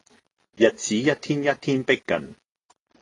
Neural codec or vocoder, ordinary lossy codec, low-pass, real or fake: none; AAC, 32 kbps; 10.8 kHz; real